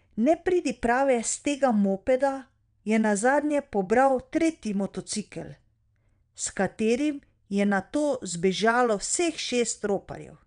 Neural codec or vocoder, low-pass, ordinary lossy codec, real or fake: vocoder, 22.05 kHz, 80 mel bands, WaveNeXt; 9.9 kHz; none; fake